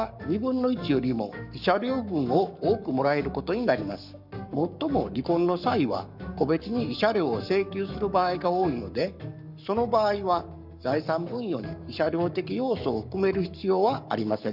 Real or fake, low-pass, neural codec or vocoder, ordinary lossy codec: fake; 5.4 kHz; codec, 44.1 kHz, 7.8 kbps, Pupu-Codec; none